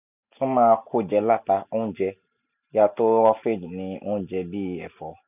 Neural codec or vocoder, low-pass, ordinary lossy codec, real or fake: none; 3.6 kHz; none; real